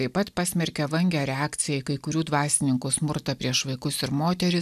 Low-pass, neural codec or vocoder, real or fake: 14.4 kHz; none; real